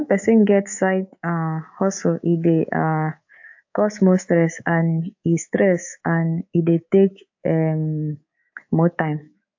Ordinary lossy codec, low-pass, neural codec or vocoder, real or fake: AAC, 48 kbps; 7.2 kHz; codec, 24 kHz, 3.1 kbps, DualCodec; fake